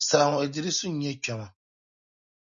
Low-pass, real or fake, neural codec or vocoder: 7.2 kHz; real; none